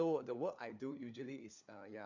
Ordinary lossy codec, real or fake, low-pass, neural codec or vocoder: none; fake; 7.2 kHz; codec, 16 kHz, 4 kbps, FunCodec, trained on LibriTTS, 50 frames a second